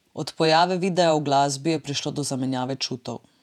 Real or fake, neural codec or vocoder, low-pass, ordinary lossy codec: fake; vocoder, 48 kHz, 128 mel bands, Vocos; 19.8 kHz; none